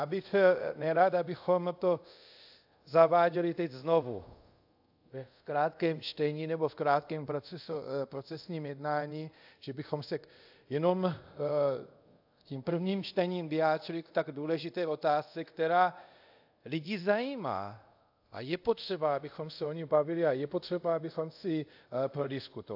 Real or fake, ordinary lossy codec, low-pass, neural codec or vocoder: fake; AAC, 48 kbps; 5.4 kHz; codec, 24 kHz, 0.5 kbps, DualCodec